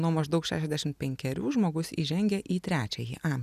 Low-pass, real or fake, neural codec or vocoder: 14.4 kHz; real; none